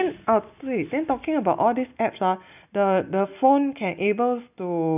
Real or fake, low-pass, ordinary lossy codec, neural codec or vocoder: real; 3.6 kHz; none; none